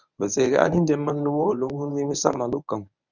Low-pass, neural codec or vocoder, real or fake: 7.2 kHz; codec, 24 kHz, 0.9 kbps, WavTokenizer, medium speech release version 1; fake